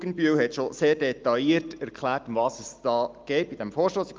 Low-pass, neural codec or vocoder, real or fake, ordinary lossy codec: 7.2 kHz; none; real; Opus, 32 kbps